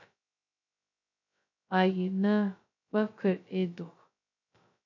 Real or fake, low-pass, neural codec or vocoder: fake; 7.2 kHz; codec, 16 kHz, 0.2 kbps, FocalCodec